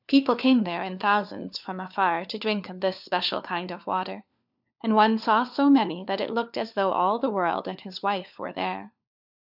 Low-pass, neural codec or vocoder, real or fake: 5.4 kHz; codec, 16 kHz, 4 kbps, FunCodec, trained on LibriTTS, 50 frames a second; fake